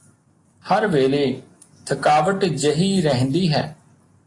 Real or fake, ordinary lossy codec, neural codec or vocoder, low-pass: real; AAC, 32 kbps; none; 10.8 kHz